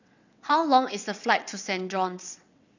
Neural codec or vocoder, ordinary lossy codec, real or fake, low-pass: vocoder, 22.05 kHz, 80 mel bands, WaveNeXt; none; fake; 7.2 kHz